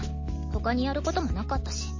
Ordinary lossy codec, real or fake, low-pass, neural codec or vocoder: MP3, 32 kbps; real; 7.2 kHz; none